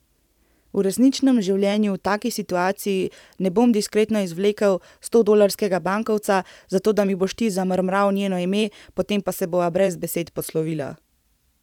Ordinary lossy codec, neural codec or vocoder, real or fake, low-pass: none; vocoder, 44.1 kHz, 128 mel bands every 512 samples, BigVGAN v2; fake; 19.8 kHz